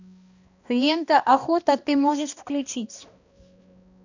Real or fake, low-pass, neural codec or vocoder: fake; 7.2 kHz; codec, 16 kHz, 1 kbps, X-Codec, HuBERT features, trained on balanced general audio